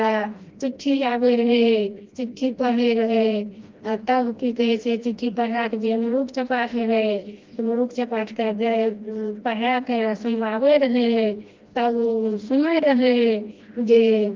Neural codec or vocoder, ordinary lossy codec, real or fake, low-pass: codec, 16 kHz, 1 kbps, FreqCodec, smaller model; Opus, 24 kbps; fake; 7.2 kHz